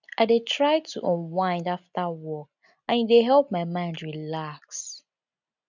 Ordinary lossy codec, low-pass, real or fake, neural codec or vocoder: none; 7.2 kHz; real; none